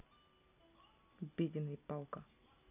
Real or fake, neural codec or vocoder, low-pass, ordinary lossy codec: real; none; 3.6 kHz; none